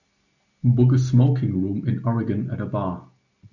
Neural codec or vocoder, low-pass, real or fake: none; 7.2 kHz; real